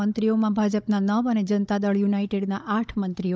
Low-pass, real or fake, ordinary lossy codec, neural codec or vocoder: none; fake; none; codec, 16 kHz, 16 kbps, FunCodec, trained on Chinese and English, 50 frames a second